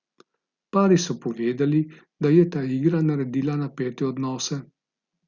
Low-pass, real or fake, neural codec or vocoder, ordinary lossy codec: 7.2 kHz; real; none; Opus, 64 kbps